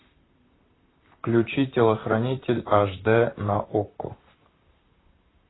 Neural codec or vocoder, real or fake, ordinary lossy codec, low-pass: vocoder, 44.1 kHz, 128 mel bands, Pupu-Vocoder; fake; AAC, 16 kbps; 7.2 kHz